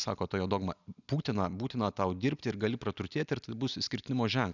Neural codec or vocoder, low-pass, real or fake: none; 7.2 kHz; real